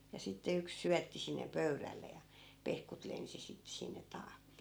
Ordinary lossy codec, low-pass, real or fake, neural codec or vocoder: none; none; real; none